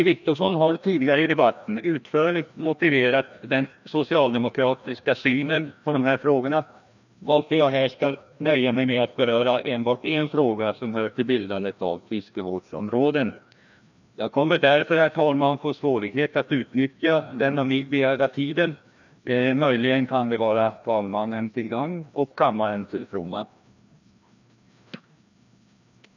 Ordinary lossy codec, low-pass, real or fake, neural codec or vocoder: none; 7.2 kHz; fake; codec, 16 kHz, 1 kbps, FreqCodec, larger model